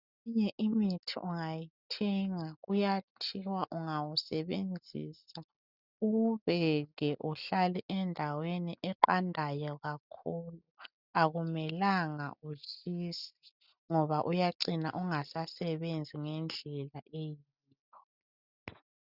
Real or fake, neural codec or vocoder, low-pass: real; none; 5.4 kHz